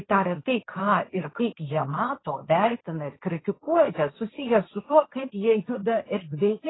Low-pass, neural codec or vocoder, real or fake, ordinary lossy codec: 7.2 kHz; codec, 16 kHz, 1.1 kbps, Voila-Tokenizer; fake; AAC, 16 kbps